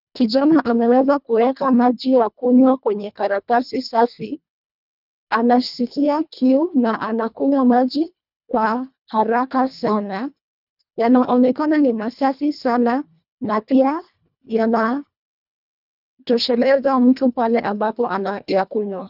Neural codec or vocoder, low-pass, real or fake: codec, 24 kHz, 1.5 kbps, HILCodec; 5.4 kHz; fake